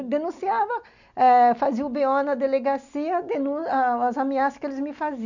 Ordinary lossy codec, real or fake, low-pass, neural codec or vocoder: none; real; 7.2 kHz; none